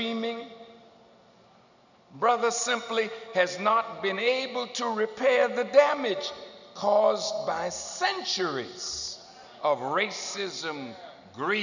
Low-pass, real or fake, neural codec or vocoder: 7.2 kHz; real; none